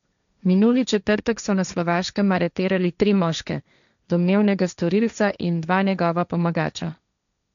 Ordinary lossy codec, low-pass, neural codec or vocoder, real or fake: none; 7.2 kHz; codec, 16 kHz, 1.1 kbps, Voila-Tokenizer; fake